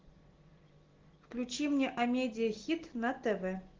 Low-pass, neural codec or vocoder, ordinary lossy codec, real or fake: 7.2 kHz; none; Opus, 16 kbps; real